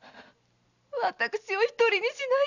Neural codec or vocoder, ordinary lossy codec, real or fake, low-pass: none; none; real; 7.2 kHz